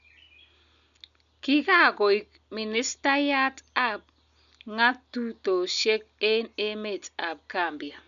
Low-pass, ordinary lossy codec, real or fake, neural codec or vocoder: 7.2 kHz; none; real; none